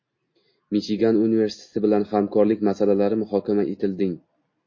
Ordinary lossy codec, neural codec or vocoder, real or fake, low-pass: MP3, 32 kbps; none; real; 7.2 kHz